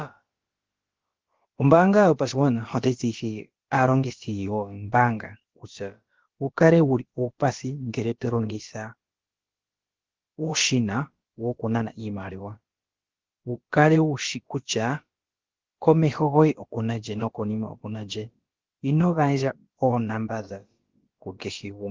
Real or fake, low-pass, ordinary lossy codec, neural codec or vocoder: fake; 7.2 kHz; Opus, 16 kbps; codec, 16 kHz, about 1 kbps, DyCAST, with the encoder's durations